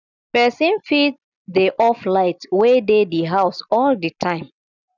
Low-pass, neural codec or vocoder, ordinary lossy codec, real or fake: 7.2 kHz; none; none; real